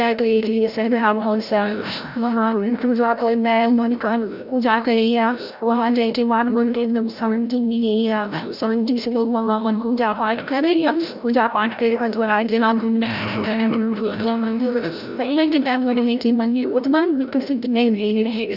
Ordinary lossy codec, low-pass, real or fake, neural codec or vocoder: none; 5.4 kHz; fake; codec, 16 kHz, 0.5 kbps, FreqCodec, larger model